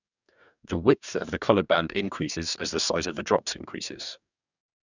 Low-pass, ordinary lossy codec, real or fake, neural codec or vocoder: 7.2 kHz; none; fake; codec, 44.1 kHz, 2.6 kbps, DAC